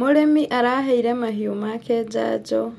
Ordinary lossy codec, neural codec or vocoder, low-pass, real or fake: MP3, 64 kbps; none; 19.8 kHz; real